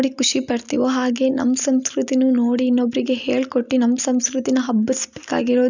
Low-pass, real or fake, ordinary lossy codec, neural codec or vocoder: 7.2 kHz; real; none; none